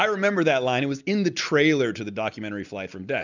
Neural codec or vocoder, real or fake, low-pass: none; real; 7.2 kHz